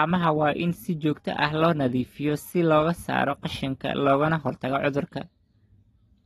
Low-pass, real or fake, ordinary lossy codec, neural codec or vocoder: 14.4 kHz; real; AAC, 32 kbps; none